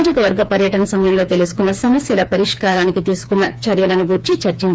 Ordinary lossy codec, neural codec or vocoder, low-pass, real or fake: none; codec, 16 kHz, 4 kbps, FreqCodec, smaller model; none; fake